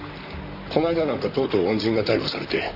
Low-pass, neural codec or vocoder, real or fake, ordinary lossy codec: 5.4 kHz; vocoder, 44.1 kHz, 128 mel bands, Pupu-Vocoder; fake; none